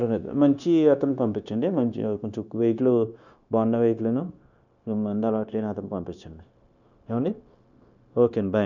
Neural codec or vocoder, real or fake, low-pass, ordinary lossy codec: codec, 16 kHz, 0.9 kbps, LongCat-Audio-Codec; fake; 7.2 kHz; none